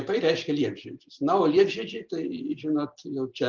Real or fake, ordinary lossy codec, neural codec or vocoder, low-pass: real; Opus, 32 kbps; none; 7.2 kHz